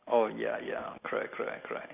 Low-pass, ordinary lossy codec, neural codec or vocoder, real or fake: 3.6 kHz; none; none; real